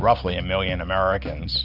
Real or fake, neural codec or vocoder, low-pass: real; none; 5.4 kHz